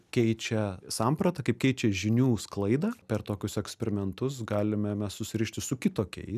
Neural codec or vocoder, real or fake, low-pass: none; real; 14.4 kHz